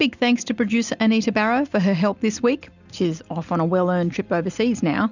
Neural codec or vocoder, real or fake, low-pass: none; real; 7.2 kHz